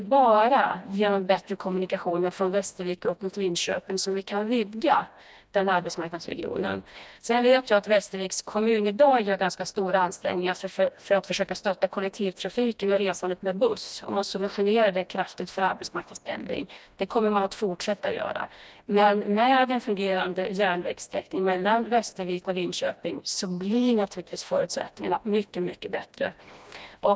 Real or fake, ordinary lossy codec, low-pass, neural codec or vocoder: fake; none; none; codec, 16 kHz, 1 kbps, FreqCodec, smaller model